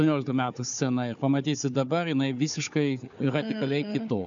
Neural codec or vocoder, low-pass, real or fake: codec, 16 kHz, 4 kbps, FunCodec, trained on Chinese and English, 50 frames a second; 7.2 kHz; fake